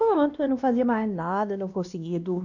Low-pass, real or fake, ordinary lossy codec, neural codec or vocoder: 7.2 kHz; fake; none; codec, 16 kHz, 1 kbps, X-Codec, WavLM features, trained on Multilingual LibriSpeech